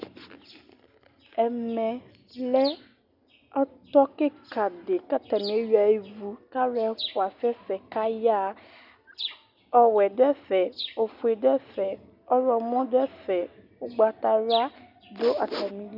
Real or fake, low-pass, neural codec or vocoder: real; 5.4 kHz; none